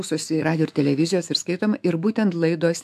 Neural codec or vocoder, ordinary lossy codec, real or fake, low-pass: autoencoder, 48 kHz, 128 numbers a frame, DAC-VAE, trained on Japanese speech; AAC, 96 kbps; fake; 14.4 kHz